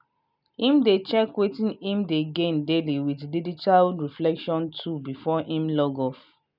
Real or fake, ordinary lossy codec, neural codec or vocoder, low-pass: real; none; none; 5.4 kHz